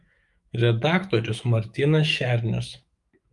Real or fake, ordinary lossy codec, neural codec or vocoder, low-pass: fake; Opus, 32 kbps; autoencoder, 48 kHz, 128 numbers a frame, DAC-VAE, trained on Japanese speech; 10.8 kHz